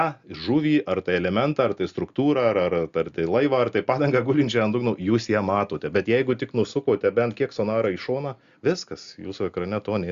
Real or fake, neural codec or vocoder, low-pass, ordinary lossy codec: real; none; 7.2 kHz; Opus, 64 kbps